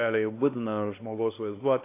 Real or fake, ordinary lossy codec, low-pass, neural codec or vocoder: fake; AAC, 24 kbps; 3.6 kHz; codec, 16 kHz, 1 kbps, X-Codec, HuBERT features, trained on LibriSpeech